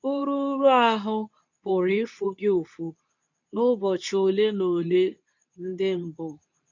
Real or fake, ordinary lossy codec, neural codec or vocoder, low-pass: fake; none; codec, 24 kHz, 0.9 kbps, WavTokenizer, medium speech release version 2; 7.2 kHz